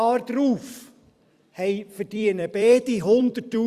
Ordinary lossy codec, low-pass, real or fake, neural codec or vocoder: Opus, 64 kbps; 14.4 kHz; fake; vocoder, 44.1 kHz, 128 mel bands every 256 samples, BigVGAN v2